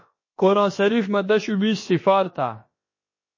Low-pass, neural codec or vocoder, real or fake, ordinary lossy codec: 7.2 kHz; codec, 16 kHz, about 1 kbps, DyCAST, with the encoder's durations; fake; MP3, 32 kbps